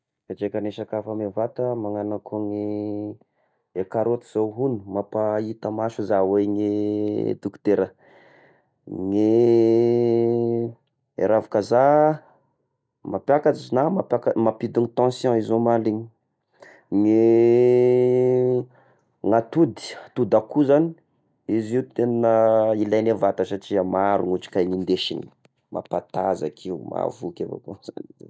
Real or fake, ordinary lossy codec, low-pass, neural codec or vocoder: real; none; none; none